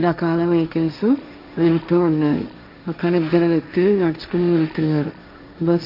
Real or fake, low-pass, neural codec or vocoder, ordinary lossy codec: fake; 5.4 kHz; codec, 16 kHz, 1.1 kbps, Voila-Tokenizer; none